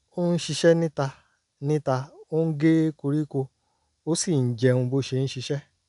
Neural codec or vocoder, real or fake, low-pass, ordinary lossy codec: none; real; 10.8 kHz; none